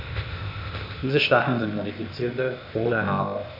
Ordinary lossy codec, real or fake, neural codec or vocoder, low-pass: MP3, 48 kbps; fake; codec, 16 kHz, 0.8 kbps, ZipCodec; 5.4 kHz